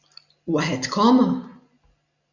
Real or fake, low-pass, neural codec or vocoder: real; 7.2 kHz; none